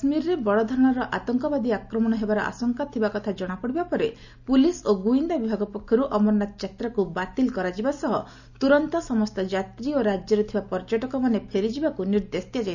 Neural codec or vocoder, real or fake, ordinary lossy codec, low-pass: none; real; none; 7.2 kHz